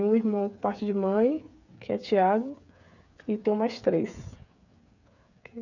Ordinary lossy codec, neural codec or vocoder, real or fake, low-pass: none; codec, 16 kHz, 8 kbps, FreqCodec, smaller model; fake; 7.2 kHz